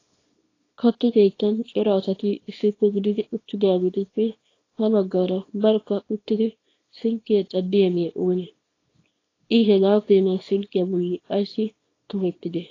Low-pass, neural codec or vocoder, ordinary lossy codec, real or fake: 7.2 kHz; codec, 24 kHz, 0.9 kbps, WavTokenizer, small release; AAC, 32 kbps; fake